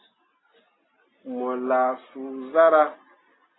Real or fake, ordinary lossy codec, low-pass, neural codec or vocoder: real; AAC, 16 kbps; 7.2 kHz; none